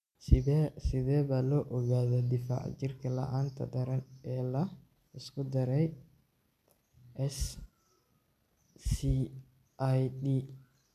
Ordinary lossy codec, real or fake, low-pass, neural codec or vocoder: none; real; 14.4 kHz; none